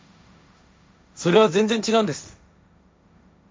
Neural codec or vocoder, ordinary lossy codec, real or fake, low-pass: codec, 16 kHz, 1.1 kbps, Voila-Tokenizer; none; fake; none